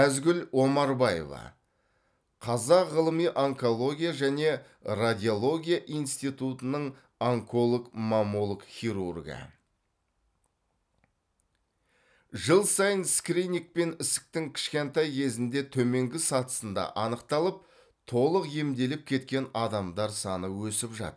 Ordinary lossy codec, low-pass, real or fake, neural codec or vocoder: none; none; real; none